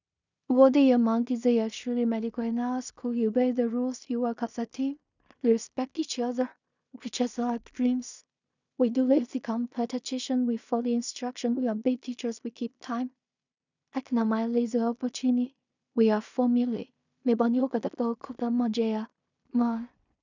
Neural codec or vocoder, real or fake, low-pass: codec, 16 kHz in and 24 kHz out, 0.4 kbps, LongCat-Audio-Codec, two codebook decoder; fake; 7.2 kHz